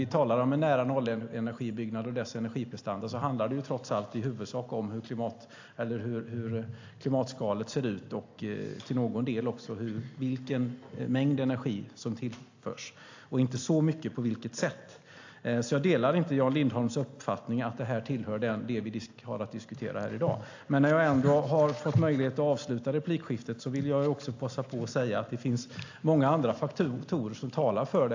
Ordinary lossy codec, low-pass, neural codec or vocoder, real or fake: AAC, 48 kbps; 7.2 kHz; none; real